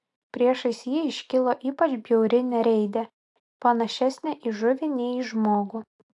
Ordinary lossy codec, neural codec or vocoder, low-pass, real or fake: AAC, 64 kbps; none; 10.8 kHz; real